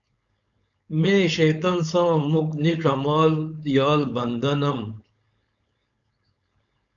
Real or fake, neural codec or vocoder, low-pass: fake; codec, 16 kHz, 4.8 kbps, FACodec; 7.2 kHz